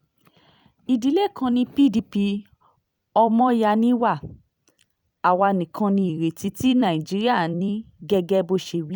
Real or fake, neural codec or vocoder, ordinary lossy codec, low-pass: fake; vocoder, 44.1 kHz, 128 mel bands every 256 samples, BigVGAN v2; none; 19.8 kHz